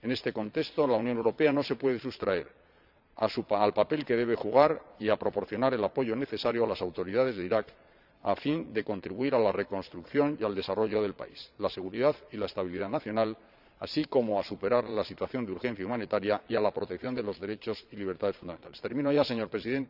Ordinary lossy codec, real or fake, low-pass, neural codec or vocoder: none; fake; 5.4 kHz; vocoder, 22.05 kHz, 80 mel bands, Vocos